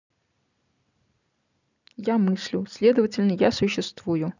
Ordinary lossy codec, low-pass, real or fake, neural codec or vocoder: none; 7.2 kHz; real; none